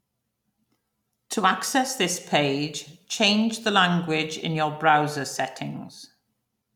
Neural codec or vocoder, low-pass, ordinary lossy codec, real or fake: none; 19.8 kHz; none; real